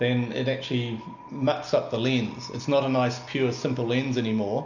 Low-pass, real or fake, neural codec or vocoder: 7.2 kHz; real; none